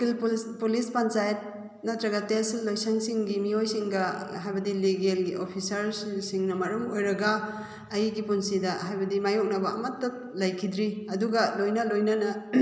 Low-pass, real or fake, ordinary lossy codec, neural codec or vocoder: none; real; none; none